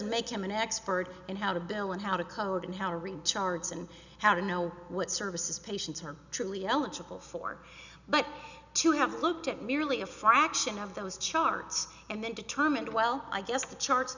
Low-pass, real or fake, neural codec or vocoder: 7.2 kHz; real; none